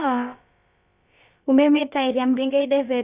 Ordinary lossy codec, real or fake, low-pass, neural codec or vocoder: Opus, 64 kbps; fake; 3.6 kHz; codec, 16 kHz, about 1 kbps, DyCAST, with the encoder's durations